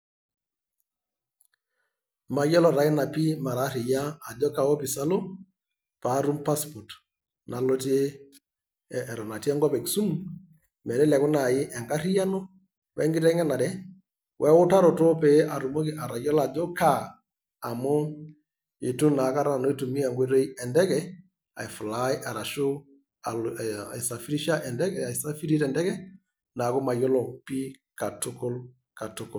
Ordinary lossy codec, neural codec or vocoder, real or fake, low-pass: none; none; real; none